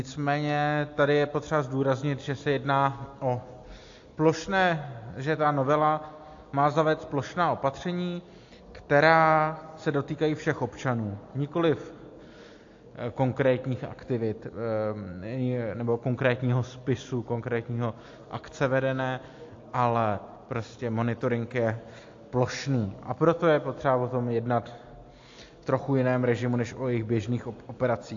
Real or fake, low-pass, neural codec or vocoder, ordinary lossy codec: real; 7.2 kHz; none; AAC, 48 kbps